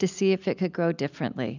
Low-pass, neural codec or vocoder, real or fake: 7.2 kHz; none; real